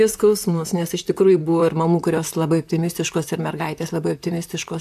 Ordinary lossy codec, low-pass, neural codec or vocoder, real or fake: AAC, 96 kbps; 14.4 kHz; vocoder, 44.1 kHz, 128 mel bands, Pupu-Vocoder; fake